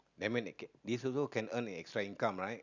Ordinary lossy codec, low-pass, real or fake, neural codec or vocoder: none; 7.2 kHz; real; none